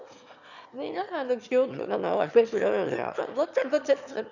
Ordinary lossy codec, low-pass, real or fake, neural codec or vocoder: none; 7.2 kHz; fake; autoencoder, 22.05 kHz, a latent of 192 numbers a frame, VITS, trained on one speaker